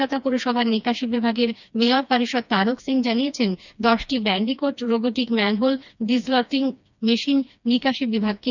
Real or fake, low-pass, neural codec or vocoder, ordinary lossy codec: fake; 7.2 kHz; codec, 16 kHz, 2 kbps, FreqCodec, smaller model; none